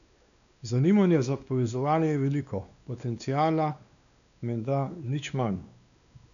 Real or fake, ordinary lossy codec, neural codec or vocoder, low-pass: fake; none; codec, 16 kHz, 2 kbps, X-Codec, WavLM features, trained on Multilingual LibriSpeech; 7.2 kHz